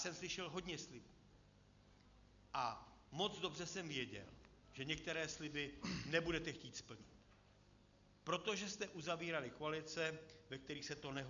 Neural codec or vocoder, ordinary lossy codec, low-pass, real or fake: none; MP3, 96 kbps; 7.2 kHz; real